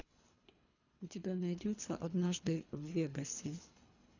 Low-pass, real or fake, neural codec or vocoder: 7.2 kHz; fake; codec, 24 kHz, 3 kbps, HILCodec